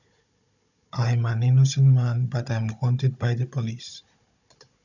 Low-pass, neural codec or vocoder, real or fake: 7.2 kHz; codec, 16 kHz, 16 kbps, FunCodec, trained on Chinese and English, 50 frames a second; fake